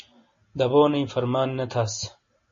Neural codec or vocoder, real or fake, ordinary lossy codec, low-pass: none; real; MP3, 32 kbps; 7.2 kHz